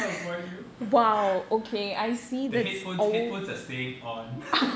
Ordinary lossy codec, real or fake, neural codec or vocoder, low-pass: none; real; none; none